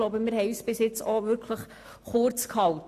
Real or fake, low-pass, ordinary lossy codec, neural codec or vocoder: real; 14.4 kHz; AAC, 64 kbps; none